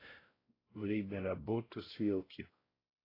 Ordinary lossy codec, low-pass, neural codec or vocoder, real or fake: AAC, 24 kbps; 5.4 kHz; codec, 16 kHz, 0.5 kbps, X-Codec, WavLM features, trained on Multilingual LibriSpeech; fake